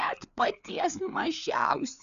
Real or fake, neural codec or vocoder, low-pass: fake; codec, 16 kHz, 4 kbps, FreqCodec, larger model; 7.2 kHz